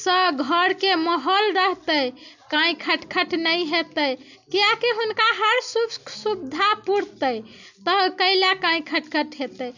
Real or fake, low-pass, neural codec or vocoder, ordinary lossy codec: real; 7.2 kHz; none; none